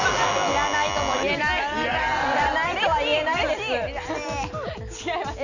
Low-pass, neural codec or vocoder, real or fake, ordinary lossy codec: 7.2 kHz; none; real; none